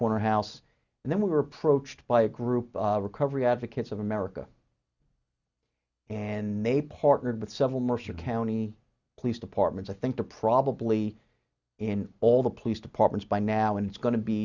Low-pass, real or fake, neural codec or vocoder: 7.2 kHz; real; none